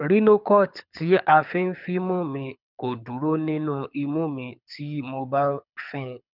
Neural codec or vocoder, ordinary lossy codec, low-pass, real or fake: codec, 24 kHz, 6 kbps, HILCodec; none; 5.4 kHz; fake